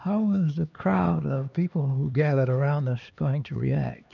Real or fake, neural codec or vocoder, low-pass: fake; codec, 16 kHz, 2 kbps, X-Codec, HuBERT features, trained on LibriSpeech; 7.2 kHz